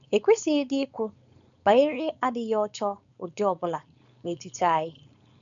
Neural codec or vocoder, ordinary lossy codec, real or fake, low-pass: codec, 16 kHz, 4.8 kbps, FACodec; none; fake; 7.2 kHz